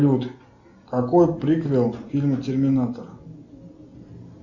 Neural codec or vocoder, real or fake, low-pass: none; real; 7.2 kHz